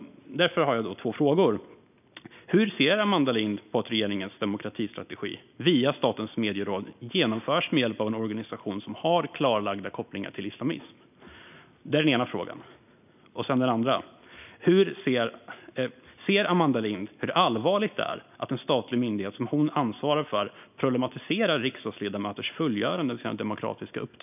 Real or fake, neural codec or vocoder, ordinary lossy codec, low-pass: real; none; none; 3.6 kHz